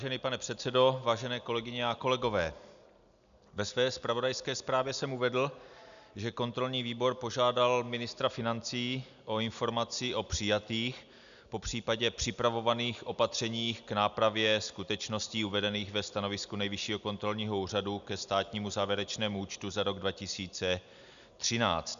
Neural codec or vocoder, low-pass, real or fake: none; 7.2 kHz; real